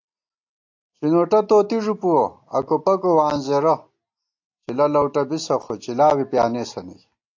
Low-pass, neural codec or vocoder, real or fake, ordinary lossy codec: 7.2 kHz; none; real; AAC, 48 kbps